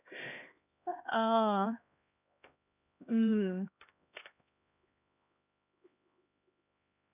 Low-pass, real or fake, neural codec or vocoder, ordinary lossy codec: 3.6 kHz; fake; codec, 16 kHz, 1 kbps, X-Codec, HuBERT features, trained on LibriSpeech; none